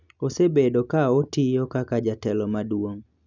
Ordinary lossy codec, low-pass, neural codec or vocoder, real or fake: none; 7.2 kHz; none; real